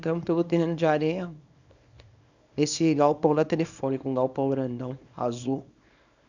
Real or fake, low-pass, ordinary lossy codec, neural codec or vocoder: fake; 7.2 kHz; none; codec, 24 kHz, 0.9 kbps, WavTokenizer, small release